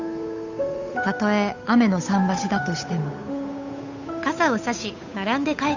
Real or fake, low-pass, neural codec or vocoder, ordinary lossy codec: fake; 7.2 kHz; codec, 16 kHz, 8 kbps, FunCodec, trained on Chinese and English, 25 frames a second; none